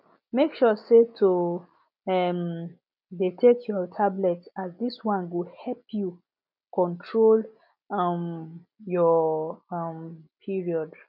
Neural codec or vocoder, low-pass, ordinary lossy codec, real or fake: none; 5.4 kHz; none; real